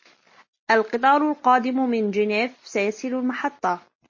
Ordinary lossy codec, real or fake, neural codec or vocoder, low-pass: MP3, 32 kbps; real; none; 7.2 kHz